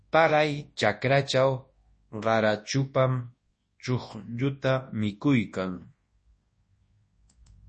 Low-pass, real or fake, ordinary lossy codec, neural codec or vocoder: 10.8 kHz; fake; MP3, 32 kbps; codec, 24 kHz, 0.9 kbps, WavTokenizer, large speech release